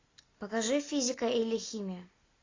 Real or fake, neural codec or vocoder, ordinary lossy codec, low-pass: real; none; AAC, 32 kbps; 7.2 kHz